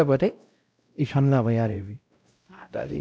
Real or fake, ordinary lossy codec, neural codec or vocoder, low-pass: fake; none; codec, 16 kHz, 0.5 kbps, X-Codec, WavLM features, trained on Multilingual LibriSpeech; none